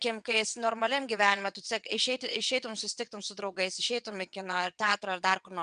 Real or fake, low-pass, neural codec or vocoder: fake; 9.9 kHz; vocoder, 22.05 kHz, 80 mel bands, WaveNeXt